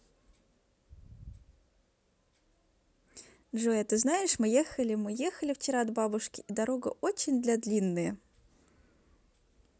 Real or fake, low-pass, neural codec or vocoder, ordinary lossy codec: real; none; none; none